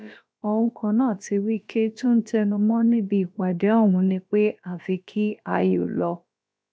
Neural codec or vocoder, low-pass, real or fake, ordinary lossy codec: codec, 16 kHz, about 1 kbps, DyCAST, with the encoder's durations; none; fake; none